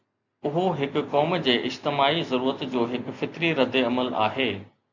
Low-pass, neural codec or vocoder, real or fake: 7.2 kHz; none; real